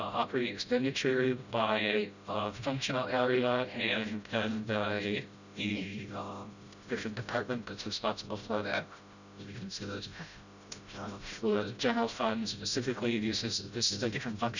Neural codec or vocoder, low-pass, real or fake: codec, 16 kHz, 0.5 kbps, FreqCodec, smaller model; 7.2 kHz; fake